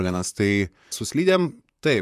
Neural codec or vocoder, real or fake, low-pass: vocoder, 44.1 kHz, 128 mel bands, Pupu-Vocoder; fake; 14.4 kHz